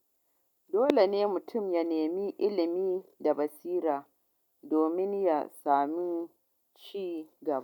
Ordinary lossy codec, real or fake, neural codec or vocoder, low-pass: none; real; none; 19.8 kHz